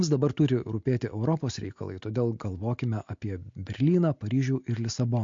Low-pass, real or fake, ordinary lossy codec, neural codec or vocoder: 7.2 kHz; real; MP3, 48 kbps; none